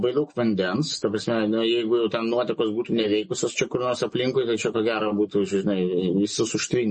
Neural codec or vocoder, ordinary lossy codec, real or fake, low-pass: none; MP3, 32 kbps; real; 10.8 kHz